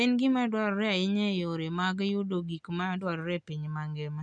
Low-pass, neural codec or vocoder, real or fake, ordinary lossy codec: 9.9 kHz; none; real; none